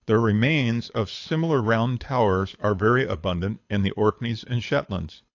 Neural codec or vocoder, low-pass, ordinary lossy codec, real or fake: codec, 24 kHz, 6 kbps, HILCodec; 7.2 kHz; AAC, 48 kbps; fake